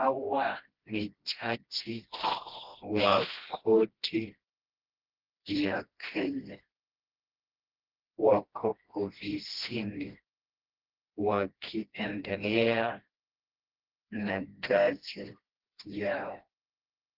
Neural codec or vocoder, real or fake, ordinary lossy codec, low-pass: codec, 16 kHz, 1 kbps, FreqCodec, smaller model; fake; Opus, 16 kbps; 5.4 kHz